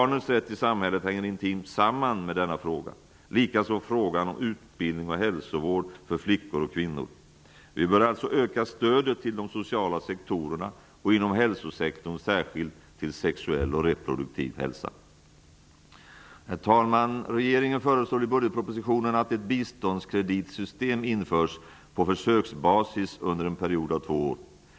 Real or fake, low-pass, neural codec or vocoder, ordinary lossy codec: real; none; none; none